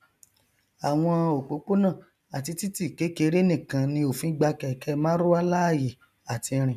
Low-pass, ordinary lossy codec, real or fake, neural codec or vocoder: 14.4 kHz; none; real; none